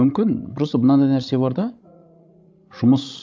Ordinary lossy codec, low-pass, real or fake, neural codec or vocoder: none; none; fake; codec, 16 kHz, 16 kbps, FreqCodec, larger model